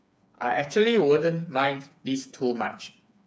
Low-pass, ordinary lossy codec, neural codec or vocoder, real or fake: none; none; codec, 16 kHz, 4 kbps, FreqCodec, smaller model; fake